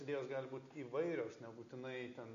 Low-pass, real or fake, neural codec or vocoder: 7.2 kHz; real; none